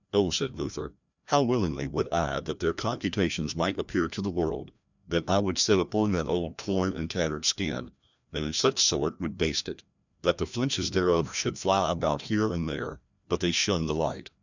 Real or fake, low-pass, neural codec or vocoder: fake; 7.2 kHz; codec, 16 kHz, 1 kbps, FreqCodec, larger model